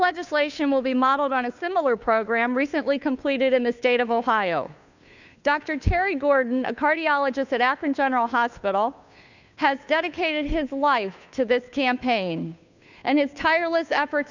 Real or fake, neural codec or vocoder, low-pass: fake; codec, 16 kHz, 2 kbps, FunCodec, trained on Chinese and English, 25 frames a second; 7.2 kHz